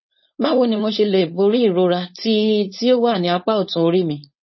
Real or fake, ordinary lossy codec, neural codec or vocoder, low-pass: fake; MP3, 24 kbps; codec, 16 kHz, 4.8 kbps, FACodec; 7.2 kHz